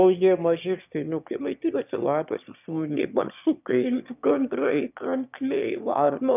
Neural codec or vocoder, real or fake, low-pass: autoencoder, 22.05 kHz, a latent of 192 numbers a frame, VITS, trained on one speaker; fake; 3.6 kHz